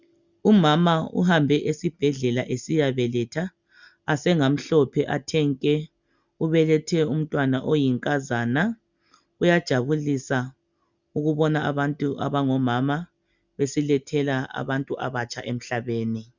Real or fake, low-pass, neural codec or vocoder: real; 7.2 kHz; none